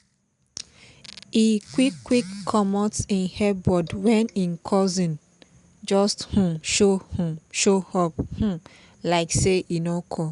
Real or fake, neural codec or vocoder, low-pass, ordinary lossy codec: real; none; 10.8 kHz; none